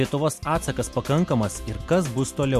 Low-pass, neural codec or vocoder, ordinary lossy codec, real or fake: 14.4 kHz; none; MP3, 64 kbps; real